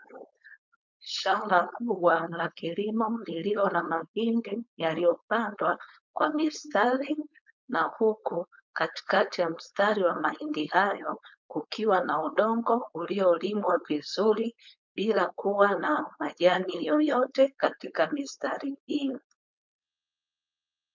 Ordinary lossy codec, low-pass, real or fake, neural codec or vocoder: MP3, 64 kbps; 7.2 kHz; fake; codec, 16 kHz, 4.8 kbps, FACodec